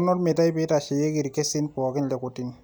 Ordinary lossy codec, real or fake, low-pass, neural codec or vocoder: none; real; none; none